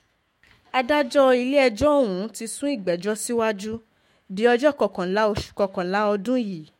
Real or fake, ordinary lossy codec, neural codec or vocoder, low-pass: fake; MP3, 64 kbps; autoencoder, 48 kHz, 128 numbers a frame, DAC-VAE, trained on Japanese speech; 19.8 kHz